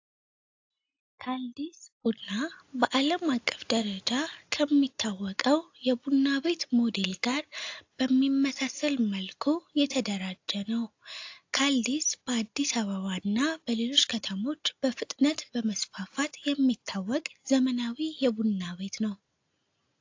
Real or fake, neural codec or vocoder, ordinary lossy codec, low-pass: real; none; AAC, 48 kbps; 7.2 kHz